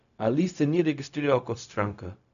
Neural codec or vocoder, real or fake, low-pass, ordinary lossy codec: codec, 16 kHz, 0.4 kbps, LongCat-Audio-Codec; fake; 7.2 kHz; none